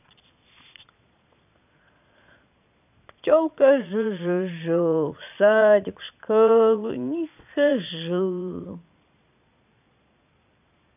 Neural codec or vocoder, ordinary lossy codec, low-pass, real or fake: vocoder, 22.05 kHz, 80 mel bands, Vocos; none; 3.6 kHz; fake